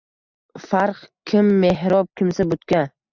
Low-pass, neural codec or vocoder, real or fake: 7.2 kHz; none; real